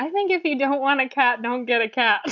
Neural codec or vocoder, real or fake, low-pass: none; real; 7.2 kHz